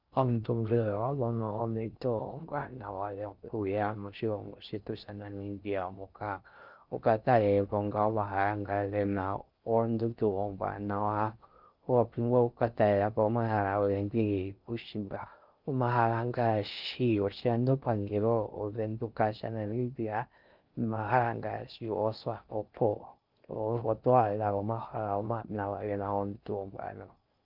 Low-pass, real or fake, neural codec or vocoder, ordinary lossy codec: 5.4 kHz; fake; codec, 16 kHz in and 24 kHz out, 0.6 kbps, FocalCodec, streaming, 4096 codes; Opus, 24 kbps